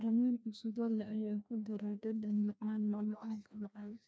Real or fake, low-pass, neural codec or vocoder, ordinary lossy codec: fake; none; codec, 16 kHz, 1 kbps, FreqCodec, larger model; none